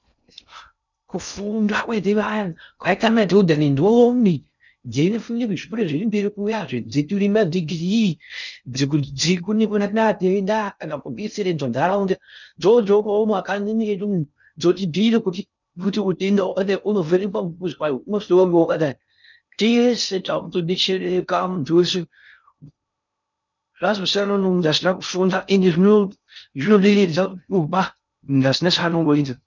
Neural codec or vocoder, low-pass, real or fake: codec, 16 kHz in and 24 kHz out, 0.6 kbps, FocalCodec, streaming, 2048 codes; 7.2 kHz; fake